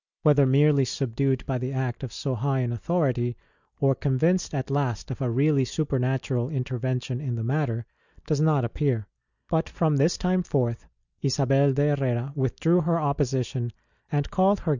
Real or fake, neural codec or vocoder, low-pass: real; none; 7.2 kHz